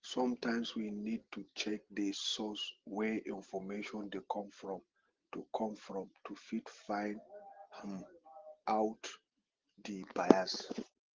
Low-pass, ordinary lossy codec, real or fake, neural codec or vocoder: 7.2 kHz; Opus, 16 kbps; real; none